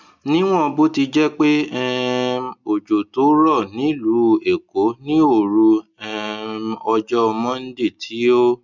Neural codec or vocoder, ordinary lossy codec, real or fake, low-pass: none; none; real; 7.2 kHz